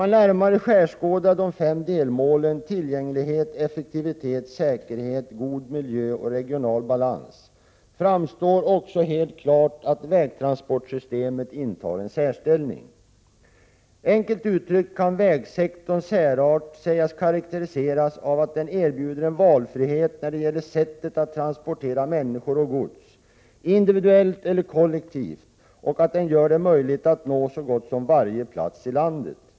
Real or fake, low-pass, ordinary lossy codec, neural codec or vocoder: real; none; none; none